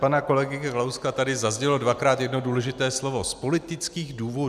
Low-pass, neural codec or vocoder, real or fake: 14.4 kHz; none; real